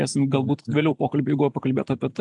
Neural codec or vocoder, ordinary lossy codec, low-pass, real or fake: none; AAC, 64 kbps; 10.8 kHz; real